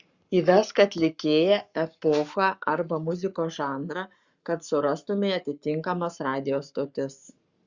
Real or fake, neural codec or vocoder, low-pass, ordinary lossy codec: fake; codec, 44.1 kHz, 7.8 kbps, Pupu-Codec; 7.2 kHz; Opus, 64 kbps